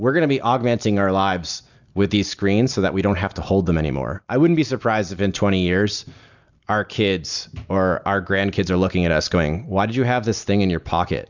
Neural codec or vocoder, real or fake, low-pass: none; real; 7.2 kHz